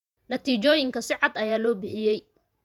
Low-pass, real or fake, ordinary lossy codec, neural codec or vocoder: 19.8 kHz; fake; none; vocoder, 48 kHz, 128 mel bands, Vocos